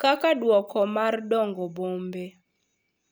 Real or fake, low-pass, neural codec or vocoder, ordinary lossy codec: real; none; none; none